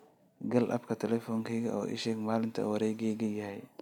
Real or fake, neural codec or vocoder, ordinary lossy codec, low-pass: real; none; none; 19.8 kHz